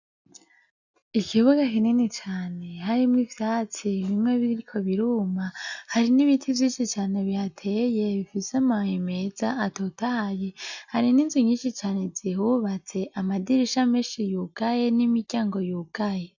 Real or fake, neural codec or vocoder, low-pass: real; none; 7.2 kHz